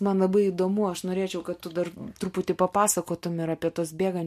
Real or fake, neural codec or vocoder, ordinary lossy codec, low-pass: real; none; MP3, 64 kbps; 14.4 kHz